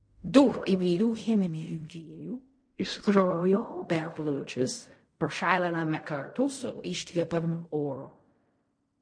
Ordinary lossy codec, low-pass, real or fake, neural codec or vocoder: MP3, 48 kbps; 9.9 kHz; fake; codec, 16 kHz in and 24 kHz out, 0.4 kbps, LongCat-Audio-Codec, fine tuned four codebook decoder